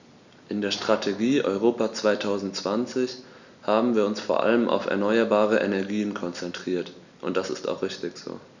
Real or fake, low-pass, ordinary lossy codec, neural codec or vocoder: real; 7.2 kHz; none; none